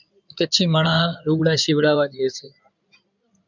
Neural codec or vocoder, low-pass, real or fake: codec, 16 kHz in and 24 kHz out, 2.2 kbps, FireRedTTS-2 codec; 7.2 kHz; fake